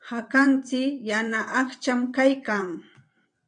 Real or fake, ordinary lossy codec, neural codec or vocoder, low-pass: fake; MP3, 64 kbps; vocoder, 22.05 kHz, 80 mel bands, WaveNeXt; 9.9 kHz